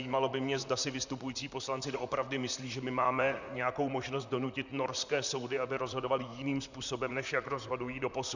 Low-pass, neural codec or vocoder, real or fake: 7.2 kHz; vocoder, 44.1 kHz, 128 mel bands, Pupu-Vocoder; fake